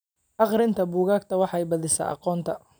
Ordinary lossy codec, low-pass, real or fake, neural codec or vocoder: none; none; real; none